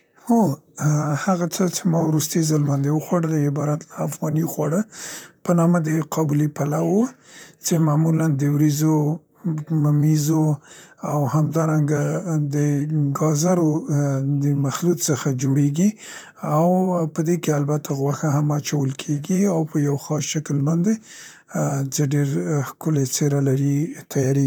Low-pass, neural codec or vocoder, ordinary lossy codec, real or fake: none; vocoder, 44.1 kHz, 128 mel bands, Pupu-Vocoder; none; fake